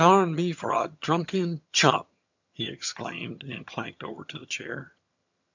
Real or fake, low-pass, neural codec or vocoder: fake; 7.2 kHz; vocoder, 22.05 kHz, 80 mel bands, HiFi-GAN